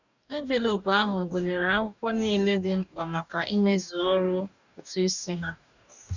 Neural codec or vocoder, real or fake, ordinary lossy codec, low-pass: codec, 44.1 kHz, 2.6 kbps, DAC; fake; none; 7.2 kHz